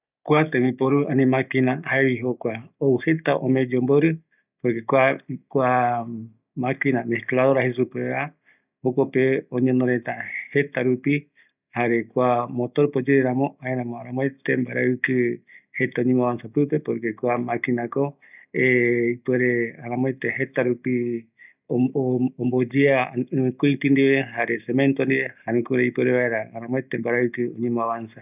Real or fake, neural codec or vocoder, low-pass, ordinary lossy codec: real; none; 3.6 kHz; none